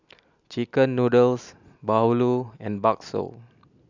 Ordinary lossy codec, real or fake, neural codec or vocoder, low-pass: none; real; none; 7.2 kHz